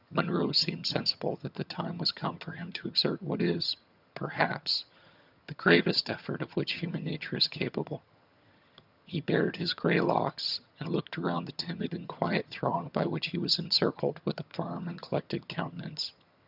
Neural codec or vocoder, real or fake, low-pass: vocoder, 22.05 kHz, 80 mel bands, HiFi-GAN; fake; 5.4 kHz